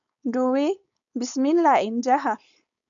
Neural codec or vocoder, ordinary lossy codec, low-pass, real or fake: codec, 16 kHz, 4.8 kbps, FACodec; MP3, 96 kbps; 7.2 kHz; fake